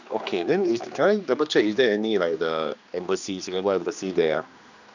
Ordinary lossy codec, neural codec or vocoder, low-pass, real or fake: none; codec, 16 kHz, 2 kbps, X-Codec, HuBERT features, trained on general audio; 7.2 kHz; fake